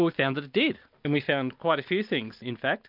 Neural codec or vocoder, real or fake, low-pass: none; real; 5.4 kHz